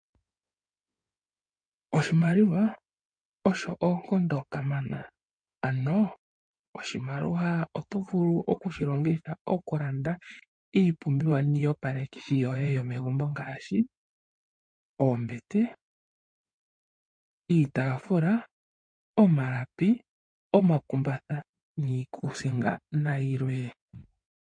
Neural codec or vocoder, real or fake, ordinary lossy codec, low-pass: codec, 16 kHz in and 24 kHz out, 2.2 kbps, FireRedTTS-2 codec; fake; MP3, 48 kbps; 9.9 kHz